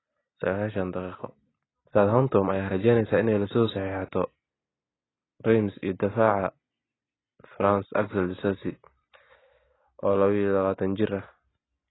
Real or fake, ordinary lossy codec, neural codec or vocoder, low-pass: real; AAC, 16 kbps; none; 7.2 kHz